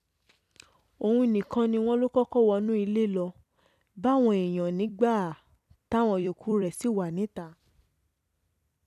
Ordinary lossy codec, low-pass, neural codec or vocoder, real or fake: none; 14.4 kHz; vocoder, 44.1 kHz, 128 mel bands every 256 samples, BigVGAN v2; fake